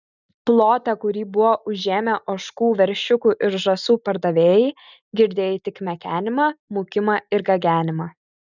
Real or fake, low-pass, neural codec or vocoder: real; 7.2 kHz; none